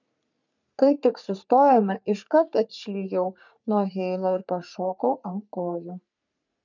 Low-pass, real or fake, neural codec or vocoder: 7.2 kHz; fake; codec, 44.1 kHz, 3.4 kbps, Pupu-Codec